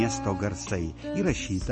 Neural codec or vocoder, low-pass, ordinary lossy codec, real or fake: none; 10.8 kHz; MP3, 32 kbps; real